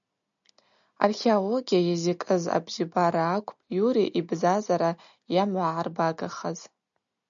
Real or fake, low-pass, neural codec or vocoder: real; 7.2 kHz; none